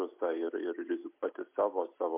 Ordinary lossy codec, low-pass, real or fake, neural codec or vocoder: MP3, 32 kbps; 3.6 kHz; real; none